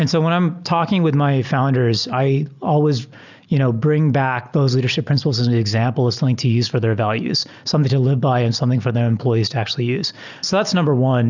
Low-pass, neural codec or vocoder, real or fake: 7.2 kHz; none; real